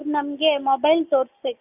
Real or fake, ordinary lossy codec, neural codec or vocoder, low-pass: real; AAC, 32 kbps; none; 3.6 kHz